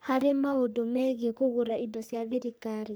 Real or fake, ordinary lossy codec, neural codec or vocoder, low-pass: fake; none; codec, 44.1 kHz, 3.4 kbps, Pupu-Codec; none